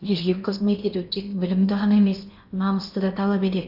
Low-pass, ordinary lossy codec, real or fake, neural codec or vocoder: 5.4 kHz; none; fake; codec, 16 kHz in and 24 kHz out, 0.8 kbps, FocalCodec, streaming, 65536 codes